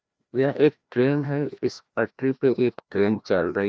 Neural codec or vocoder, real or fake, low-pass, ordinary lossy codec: codec, 16 kHz, 1 kbps, FreqCodec, larger model; fake; none; none